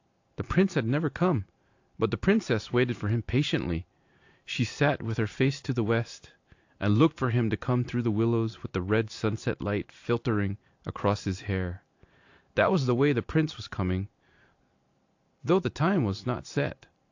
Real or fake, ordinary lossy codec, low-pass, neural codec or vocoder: fake; AAC, 48 kbps; 7.2 kHz; vocoder, 44.1 kHz, 128 mel bands every 512 samples, BigVGAN v2